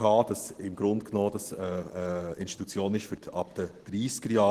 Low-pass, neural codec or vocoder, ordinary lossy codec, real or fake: 14.4 kHz; none; Opus, 16 kbps; real